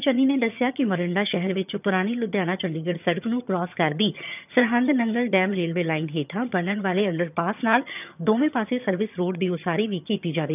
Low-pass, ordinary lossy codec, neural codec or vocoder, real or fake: 3.6 kHz; none; vocoder, 22.05 kHz, 80 mel bands, HiFi-GAN; fake